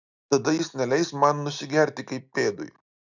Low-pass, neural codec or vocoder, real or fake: 7.2 kHz; none; real